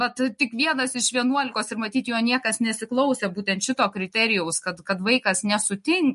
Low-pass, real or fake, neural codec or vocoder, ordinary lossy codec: 14.4 kHz; real; none; MP3, 48 kbps